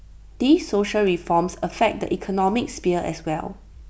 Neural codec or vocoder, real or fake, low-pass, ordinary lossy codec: none; real; none; none